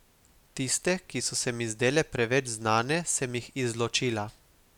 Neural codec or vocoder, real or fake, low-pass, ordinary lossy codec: none; real; 19.8 kHz; none